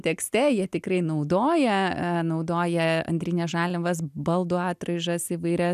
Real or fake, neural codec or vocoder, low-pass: real; none; 14.4 kHz